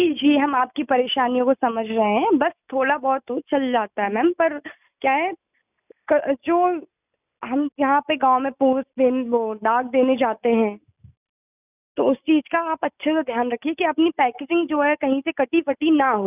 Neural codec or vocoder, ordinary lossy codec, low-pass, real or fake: none; none; 3.6 kHz; real